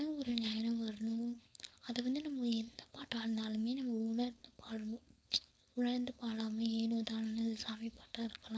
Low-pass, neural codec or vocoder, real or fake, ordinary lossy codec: none; codec, 16 kHz, 4.8 kbps, FACodec; fake; none